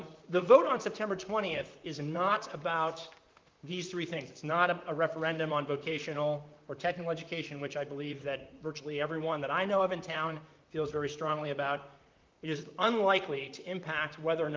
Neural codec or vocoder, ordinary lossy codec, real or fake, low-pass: vocoder, 44.1 kHz, 128 mel bands, Pupu-Vocoder; Opus, 24 kbps; fake; 7.2 kHz